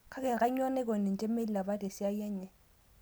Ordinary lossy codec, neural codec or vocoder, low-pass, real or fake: none; none; none; real